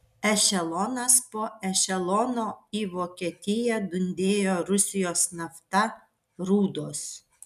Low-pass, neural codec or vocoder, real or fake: 14.4 kHz; none; real